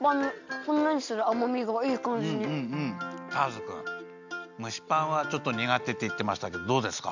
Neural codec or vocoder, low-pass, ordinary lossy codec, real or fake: none; 7.2 kHz; none; real